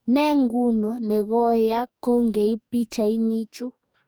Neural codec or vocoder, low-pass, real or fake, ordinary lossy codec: codec, 44.1 kHz, 2.6 kbps, DAC; none; fake; none